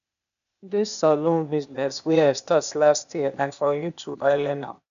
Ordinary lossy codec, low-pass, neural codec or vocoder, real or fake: none; 7.2 kHz; codec, 16 kHz, 0.8 kbps, ZipCodec; fake